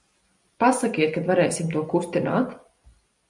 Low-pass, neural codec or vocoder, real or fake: 10.8 kHz; none; real